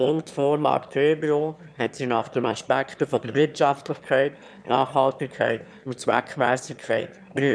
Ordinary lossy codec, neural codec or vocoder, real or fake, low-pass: none; autoencoder, 22.05 kHz, a latent of 192 numbers a frame, VITS, trained on one speaker; fake; none